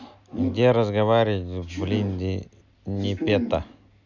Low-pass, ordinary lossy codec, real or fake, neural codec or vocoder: 7.2 kHz; none; real; none